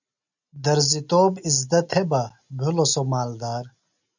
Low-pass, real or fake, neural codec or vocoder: 7.2 kHz; real; none